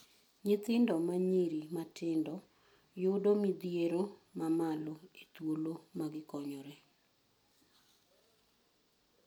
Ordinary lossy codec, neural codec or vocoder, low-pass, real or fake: none; none; none; real